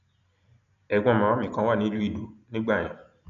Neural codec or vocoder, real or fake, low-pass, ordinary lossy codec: none; real; 7.2 kHz; none